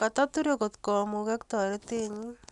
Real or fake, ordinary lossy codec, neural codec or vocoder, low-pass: real; none; none; 10.8 kHz